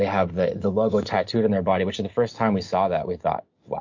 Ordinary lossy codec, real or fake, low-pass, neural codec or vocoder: MP3, 48 kbps; real; 7.2 kHz; none